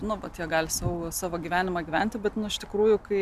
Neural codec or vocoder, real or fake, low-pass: none; real; 14.4 kHz